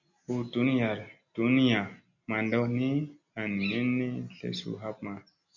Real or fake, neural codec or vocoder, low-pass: real; none; 7.2 kHz